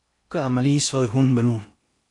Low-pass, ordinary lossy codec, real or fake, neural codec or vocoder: 10.8 kHz; AAC, 64 kbps; fake; codec, 16 kHz in and 24 kHz out, 0.6 kbps, FocalCodec, streaming, 4096 codes